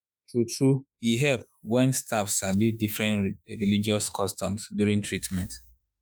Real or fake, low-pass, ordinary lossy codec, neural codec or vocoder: fake; none; none; autoencoder, 48 kHz, 32 numbers a frame, DAC-VAE, trained on Japanese speech